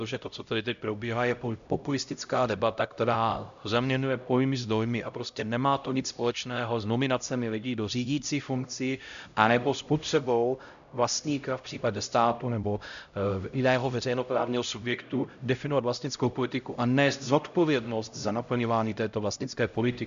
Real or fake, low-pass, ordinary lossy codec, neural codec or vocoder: fake; 7.2 kHz; AAC, 96 kbps; codec, 16 kHz, 0.5 kbps, X-Codec, HuBERT features, trained on LibriSpeech